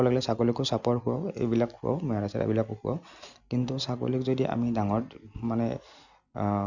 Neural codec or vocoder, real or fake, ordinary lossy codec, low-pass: none; real; none; 7.2 kHz